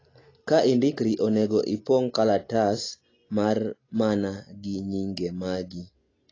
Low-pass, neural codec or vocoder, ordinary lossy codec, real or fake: 7.2 kHz; none; AAC, 32 kbps; real